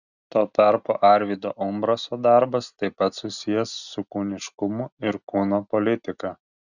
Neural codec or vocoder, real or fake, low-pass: none; real; 7.2 kHz